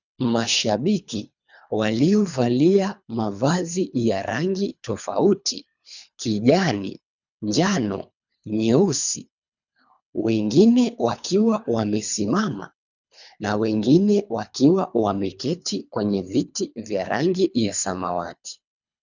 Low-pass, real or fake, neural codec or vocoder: 7.2 kHz; fake; codec, 24 kHz, 3 kbps, HILCodec